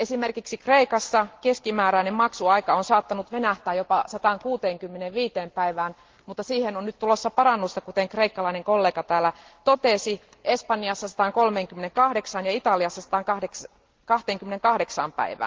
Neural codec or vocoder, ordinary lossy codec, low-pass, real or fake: none; Opus, 16 kbps; 7.2 kHz; real